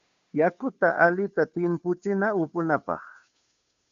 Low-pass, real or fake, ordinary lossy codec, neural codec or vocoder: 7.2 kHz; fake; AAC, 64 kbps; codec, 16 kHz, 2 kbps, FunCodec, trained on Chinese and English, 25 frames a second